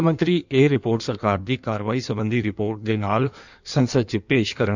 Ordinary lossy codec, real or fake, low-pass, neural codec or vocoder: none; fake; 7.2 kHz; codec, 16 kHz in and 24 kHz out, 1.1 kbps, FireRedTTS-2 codec